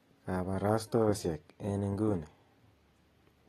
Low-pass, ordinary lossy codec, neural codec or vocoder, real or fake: 19.8 kHz; AAC, 32 kbps; none; real